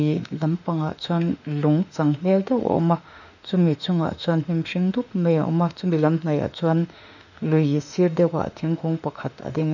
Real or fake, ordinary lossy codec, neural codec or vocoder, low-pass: fake; none; autoencoder, 48 kHz, 32 numbers a frame, DAC-VAE, trained on Japanese speech; 7.2 kHz